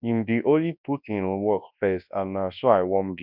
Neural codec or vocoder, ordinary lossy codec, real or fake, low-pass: codec, 24 kHz, 0.9 kbps, WavTokenizer, large speech release; none; fake; 5.4 kHz